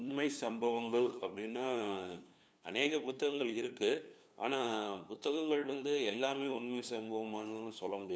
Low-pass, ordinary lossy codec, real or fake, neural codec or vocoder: none; none; fake; codec, 16 kHz, 2 kbps, FunCodec, trained on LibriTTS, 25 frames a second